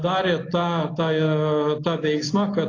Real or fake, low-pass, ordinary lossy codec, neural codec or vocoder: real; 7.2 kHz; AAC, 32 kbps; none